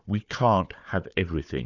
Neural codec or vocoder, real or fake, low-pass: codec, 16 kHz, 4 kbps, FunCodec, trained on Chinese and English, 50 frames a second; fake; 7.2 kHz